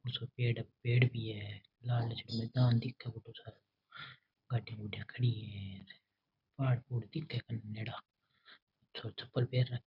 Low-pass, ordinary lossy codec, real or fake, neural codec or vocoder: 5.4 kHz; none; real; none